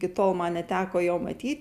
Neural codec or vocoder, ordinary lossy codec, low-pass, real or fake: none; Opus, 64 kbps; 14.4 kHz; real